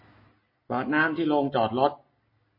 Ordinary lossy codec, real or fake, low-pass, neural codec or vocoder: MP3, 24 kbps; real; 5.4 kHz; none